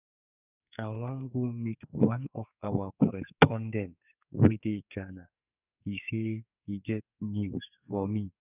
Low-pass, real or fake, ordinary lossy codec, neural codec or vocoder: 3.6 kHz; fake; none; codec, 44.1 kHz, 2.6 kbps, SNAC